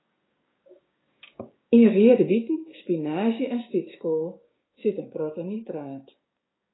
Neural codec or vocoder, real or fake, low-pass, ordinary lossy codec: codec, 16 kHz, 6 kbps, DAC; fake; 7.2 kHz; AAC, 16 kbps